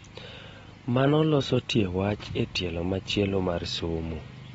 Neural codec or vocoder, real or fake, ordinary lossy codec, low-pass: none; real; AAC, 24 kbps; 19.8 kHz